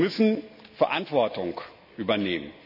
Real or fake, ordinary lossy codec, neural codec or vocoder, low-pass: real; none; none; 5.4 kHz